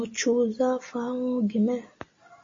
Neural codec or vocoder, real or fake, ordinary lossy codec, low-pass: none; real; MP3, 32 kbps; 7.2 kHz